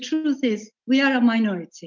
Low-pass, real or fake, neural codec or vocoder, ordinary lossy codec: 7.2 kHz; real; none; MP3, 64 kbps